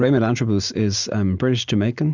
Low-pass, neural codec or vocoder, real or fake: 7.2 kHz; none; real